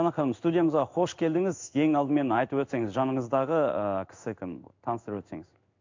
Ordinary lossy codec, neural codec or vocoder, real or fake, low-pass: MP3, 64 kbps; codec, 16 kHz in and 24 kHz out, 1 kbps, XY-Tokenizer; fake; 7.2 kHz